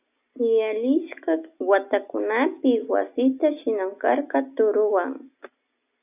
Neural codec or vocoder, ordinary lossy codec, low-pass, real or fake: none; AAC, 32 kbps; 3.6 kHz; real